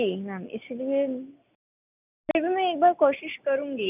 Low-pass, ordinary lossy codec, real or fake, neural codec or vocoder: 3.6 kHz; none; real; none